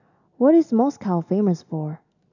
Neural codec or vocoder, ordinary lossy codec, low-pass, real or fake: none; none; 7.2 kHz; real